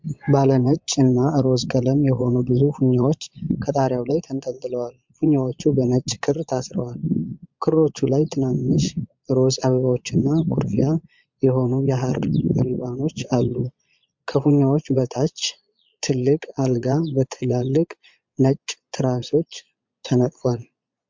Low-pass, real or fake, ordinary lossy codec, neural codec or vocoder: 7.2 kHz; fake; MP3, 64 kbps; vocoder, 22.05 kHz, 80 mel bands, Vocos